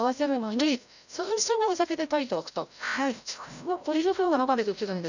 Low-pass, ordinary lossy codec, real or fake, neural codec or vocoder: 7.2 kHz; none; fake; codec, 16 kHz, 0.5 kbps, FreqCodec, larger model